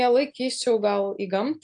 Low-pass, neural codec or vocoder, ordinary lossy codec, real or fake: 9.9 kHz; none; AAC, 64 kbps; real